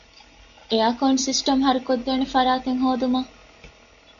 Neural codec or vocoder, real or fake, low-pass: none; real; 7.2 kHz